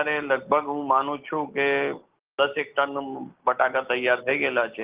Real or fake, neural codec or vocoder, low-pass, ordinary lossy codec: real; none; 3.6 kHz; Opus, 32 kbps